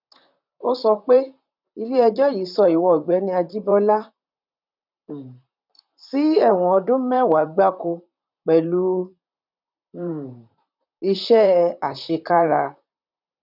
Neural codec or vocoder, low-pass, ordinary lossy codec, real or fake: vocoder, 44.1 kHz, 128 mel bands, Pupu-Vocoder; 5.4 kHz; none; fake